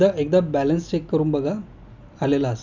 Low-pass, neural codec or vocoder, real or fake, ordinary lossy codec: 7.2 kHz; none; real; none